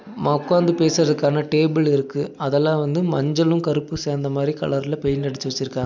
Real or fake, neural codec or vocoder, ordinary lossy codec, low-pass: real; none; none; 7.2 kHz